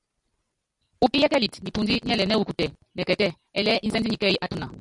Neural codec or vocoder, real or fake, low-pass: none; real; 10.8 kHz